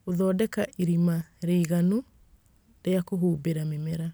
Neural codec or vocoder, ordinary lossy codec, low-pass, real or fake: none; none; none; real